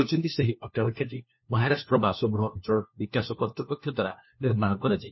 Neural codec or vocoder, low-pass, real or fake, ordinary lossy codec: codec, 16 kHz, 1 kbps, FunCodec, trained on LibriTTS, 50 frames a second; 7.2 kHz; fake; MP3, 24 kbps